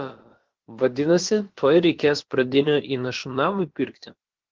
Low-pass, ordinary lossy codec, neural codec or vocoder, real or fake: 7.2 kHz; Opus, 16 kbps; codec, 16 kHz, about 1 kbps, DyCAST, with the encoder's durations; fake